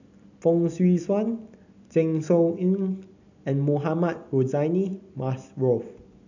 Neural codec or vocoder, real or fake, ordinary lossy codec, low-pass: none; real; none; 7.2 kHz